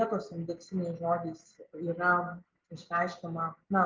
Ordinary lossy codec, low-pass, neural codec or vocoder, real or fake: Opus, 32 kbps; 7.2 kHz; none; real